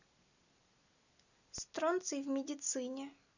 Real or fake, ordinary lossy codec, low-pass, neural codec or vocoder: real; none; 7.2 kHz; none